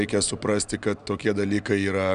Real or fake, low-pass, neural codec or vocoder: real; 9.9 kHz; none